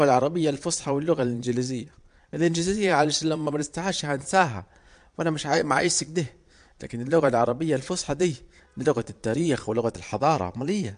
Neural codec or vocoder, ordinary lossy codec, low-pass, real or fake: vocoder, 22.05 kHz, 80 mel bands, Vocos; MP3, 64 kbps; 9.9 kHz; fake